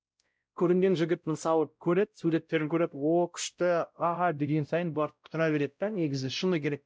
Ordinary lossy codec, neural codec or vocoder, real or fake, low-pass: none; codec, 16 kHz, 0.5 kbps, X-Codec, WavLM features, trained on Multilingual LibriSpeech; fake; none